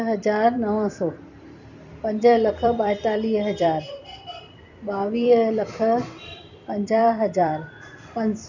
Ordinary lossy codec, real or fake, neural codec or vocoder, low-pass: none; fake; vocoder, 44.1 kHz, 128 mel bands every 256 samples, BigVGAN v2; 7.2 kHz